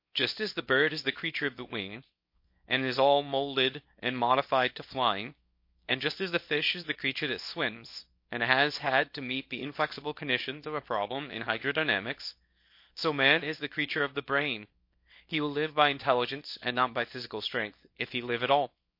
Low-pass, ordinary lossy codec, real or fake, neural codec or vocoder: 5.4 kHz; MP3, 32 kbps; fake; codec, 24 kHz, 0.9 kbps, WavTokenizer, small release